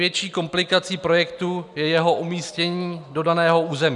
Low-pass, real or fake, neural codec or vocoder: 10.8 kHz; real; none